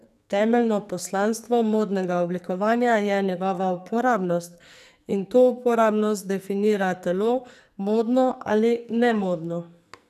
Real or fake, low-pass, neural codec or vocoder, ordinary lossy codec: fake; 14.4 kHz; codec, 44.1 kHz, 2.6 kbps, SNAC; none